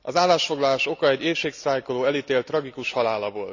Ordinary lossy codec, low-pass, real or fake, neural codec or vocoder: none; 7.2 kHz; real; none